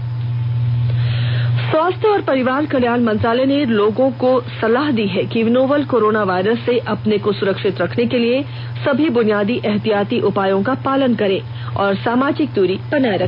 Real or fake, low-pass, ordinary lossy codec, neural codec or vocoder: real; 5.4 kHz; none; none